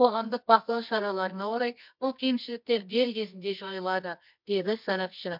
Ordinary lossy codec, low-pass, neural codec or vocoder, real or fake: MP3, 48 kbps; 5.4 kHz; codec, 24 kHz, 0.9 kbps, WavTokenizer, medium music audio release; fake